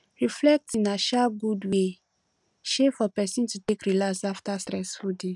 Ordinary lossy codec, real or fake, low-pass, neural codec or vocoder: none; real; 10.8 kHz; none